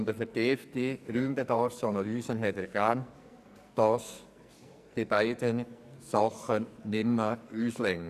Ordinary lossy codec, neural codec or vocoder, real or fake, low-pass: none; codec, 44.1 kHz, 2.6 kbps, SNAC; fake; 14.4 kHz